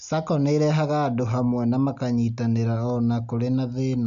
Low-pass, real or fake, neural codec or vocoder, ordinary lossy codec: 7.2 kHz; real; none; none